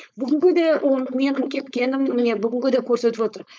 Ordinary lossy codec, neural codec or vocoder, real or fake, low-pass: none; codec, 16 kHz, 4.8 kbps, FACodec; fake; none